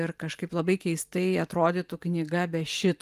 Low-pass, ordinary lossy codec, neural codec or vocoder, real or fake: 14.4 kHz; Opus, 32 kbps; vocoder, 44.1 kHz, 128 mel bands every 512 samples, BigVGAN v2; fake